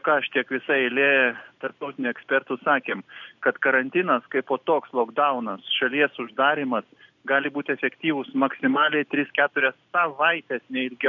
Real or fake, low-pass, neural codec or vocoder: real; 7.2 kHz; none